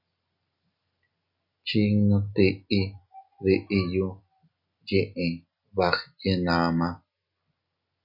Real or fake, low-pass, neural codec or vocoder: real; 5.4 kHz; none